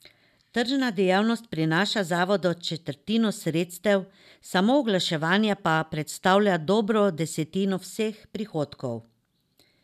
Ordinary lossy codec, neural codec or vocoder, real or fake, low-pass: none; none; real; 14.4 kHz